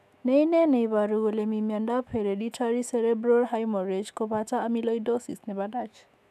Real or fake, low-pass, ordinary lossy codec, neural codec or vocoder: fake; 14.4 kHz; none; autoencoder, 48 kHz, 128 numbers a frame, DAC-VAE, trained on Japanese speech